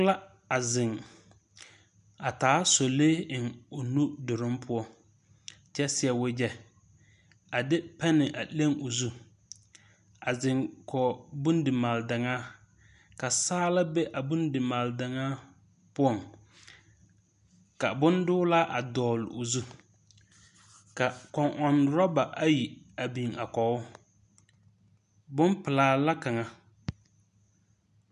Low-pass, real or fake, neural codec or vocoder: 10.8 kHz; real; none